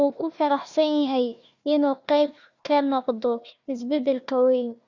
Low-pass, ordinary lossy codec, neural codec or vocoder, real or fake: 7.2 kHz; Opus, 64 kbps; codec, 16 kHz, 1 kbps, FunCodec, trained on Chinese and English, 50 frames a second; fake